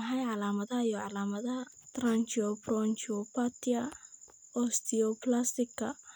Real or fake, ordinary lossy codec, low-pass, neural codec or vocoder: real; none; none; none